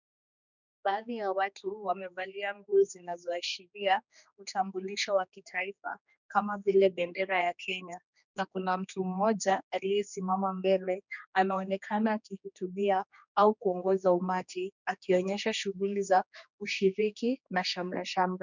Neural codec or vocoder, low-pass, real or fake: codec, 16 kHz, 2 kbps, X-Codec, HuBERT features, trained on general audio; 7.2 kHz; fake